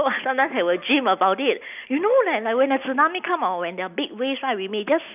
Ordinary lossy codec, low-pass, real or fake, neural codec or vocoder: none; 3.6 kHz; real; none